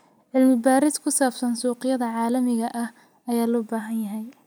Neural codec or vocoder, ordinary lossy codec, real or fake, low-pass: none; none; real; none